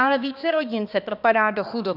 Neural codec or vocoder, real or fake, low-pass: codec, 16 kHz, 2 kbps, X-Codec, HuBERT features, trained on balanced general audio; fake; 5.4 kHz